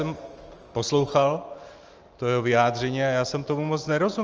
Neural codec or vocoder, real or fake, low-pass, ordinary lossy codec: none; real; 7.2 kHz; Opus, 24 kbps